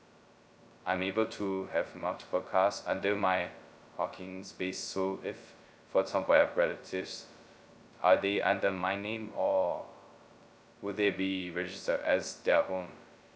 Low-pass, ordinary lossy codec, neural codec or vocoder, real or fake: none; none; codec, 16 kHz, 0.2 kbps, FocalCodec; fake